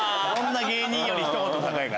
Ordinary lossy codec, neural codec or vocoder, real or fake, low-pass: none; none; real; none